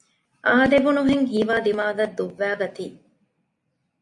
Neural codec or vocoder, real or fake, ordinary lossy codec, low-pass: none; real; MP3, 48 kbps; 10.8 kHz